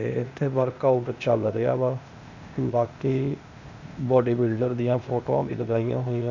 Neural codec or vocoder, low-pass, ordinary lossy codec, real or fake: codec, 16 kHz, 0.8 kbps, ZipCodec; 7.2 kHz; none; fake